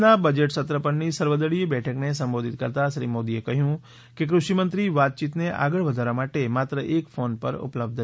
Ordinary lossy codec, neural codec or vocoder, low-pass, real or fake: none; none; none; real